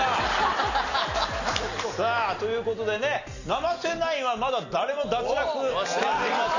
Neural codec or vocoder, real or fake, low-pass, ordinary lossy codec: none; real; 7.2 kHz; none